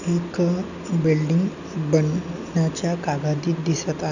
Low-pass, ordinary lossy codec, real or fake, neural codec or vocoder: 7.2 kHz; none; real; none